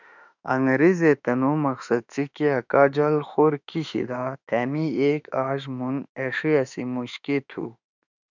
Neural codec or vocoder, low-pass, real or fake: autoencoder, 48 kHz, 32 numbers a frame, DAC-VAE, trained on Japanese speech; 7.2 kHz; fake